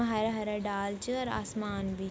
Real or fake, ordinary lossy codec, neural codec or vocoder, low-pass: real; none; none; none